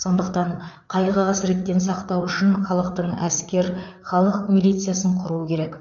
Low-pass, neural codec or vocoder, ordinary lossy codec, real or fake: 7.2 kHz; codec, 16 kHz, 4 kbps, FreqCodec, larger model; none; fake